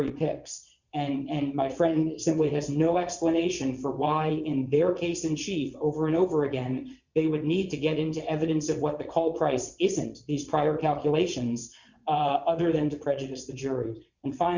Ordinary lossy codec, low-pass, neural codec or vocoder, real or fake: Opus, 64 kbps; 7.2 kHz; vocoder, 22.05 kHz, 80 mel bands, WaveNeXt; fake